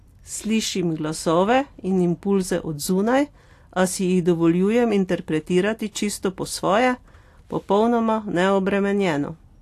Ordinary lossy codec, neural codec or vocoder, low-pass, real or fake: AAC, 64 kbps; none; 14.4 kHz; real